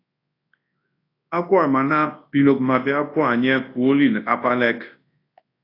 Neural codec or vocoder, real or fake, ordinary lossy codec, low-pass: codec, 24 kHz, 0.9 kbps, WavTokenizer, large speech release; fake; AAC, 32 kbps; 5.4 kHz